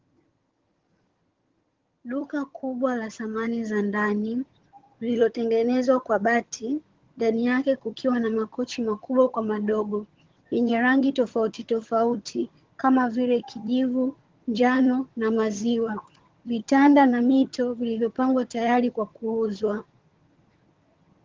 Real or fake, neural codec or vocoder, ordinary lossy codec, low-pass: fake; vocoder, 22.05 kHz, 80 mel bands, HiFi-GAN; Opus, 16 kbps; 7.2 kHz